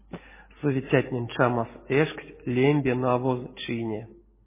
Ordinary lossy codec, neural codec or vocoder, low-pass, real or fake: MP3, 16 kbps; none; 3.6 kHz; real